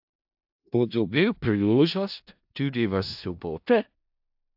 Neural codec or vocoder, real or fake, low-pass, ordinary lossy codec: codec, 16 kHz in and 24 kHz out, 0.4 kbps, LongCat-Audio-Codec, four codebook decoder; fake; 5.4 kHz; AAC, 48 kbps